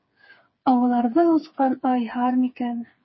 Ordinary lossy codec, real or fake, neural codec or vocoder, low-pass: MP3, 24 kbps; fake; codec, 16 kHz, 4 kbps, FreqCodec, smaller model; 7.2 kHz